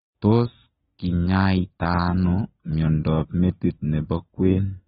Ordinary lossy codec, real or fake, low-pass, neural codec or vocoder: AAC, 16 kbps; real; 19.8 kHz; none